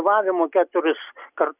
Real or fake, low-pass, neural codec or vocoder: real; 3.6 kHz; none